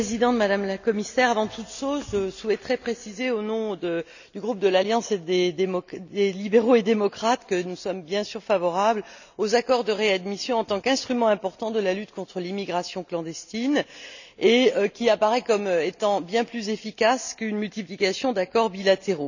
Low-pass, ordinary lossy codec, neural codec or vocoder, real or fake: 7.2 kHz; none; none; real